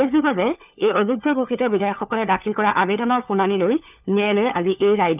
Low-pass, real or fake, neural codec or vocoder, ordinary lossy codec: 3.6 kHz; fake; codec, 16 kHz, 4 kbps, FunCodec, trained on LibriTTS, 50 frames a second; none